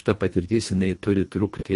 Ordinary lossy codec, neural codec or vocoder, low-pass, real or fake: MP3, 48 kbps; codec, 24 kHz, 1.5 kbps, HILCodec; 10.8 kHz; fake